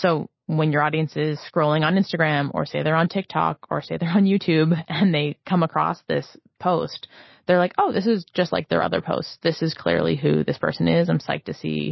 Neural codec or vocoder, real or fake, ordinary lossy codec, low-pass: none; real; MP3, 24 kbps; 7.2 kHz